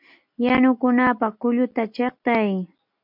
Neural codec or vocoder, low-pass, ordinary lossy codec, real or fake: none; 5.4 kHz; MP3, 48 kbps; real